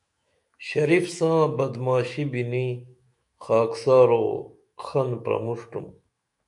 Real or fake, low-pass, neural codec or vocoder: fake; 10.8 kHz; autoencoder, 48 kHz, 128 numbers a frame, DAC-VAE, trained on Japanese speech